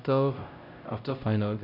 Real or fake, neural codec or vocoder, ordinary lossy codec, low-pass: fake; codec, 16 kHz, 0.5 kbps, X-Codec, HuBERT features, trained on LibriSpeech; none; 5.4 kHz